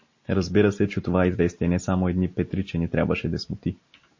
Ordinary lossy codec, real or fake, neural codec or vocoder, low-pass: MP3, 32 kbps; real; none; 7.2 kHz